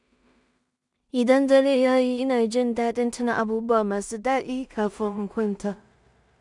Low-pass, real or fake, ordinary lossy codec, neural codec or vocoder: 10.8 kHz; fake; none; codec, 16 kHz in and 24 kHz out, 0.4 kbps, LongCat-Audio-Codec, two codebook decoder